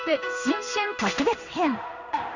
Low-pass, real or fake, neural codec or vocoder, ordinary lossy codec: 7.2 kHz; fake; codec, 16 kHz in and 24 kHz out, 1 kbps, XY-Tokenizer; none